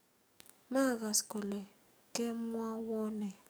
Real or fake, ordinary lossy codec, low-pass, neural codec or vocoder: fake; none; none; codec, 44.1 kHz, 7.8 kbps, DAC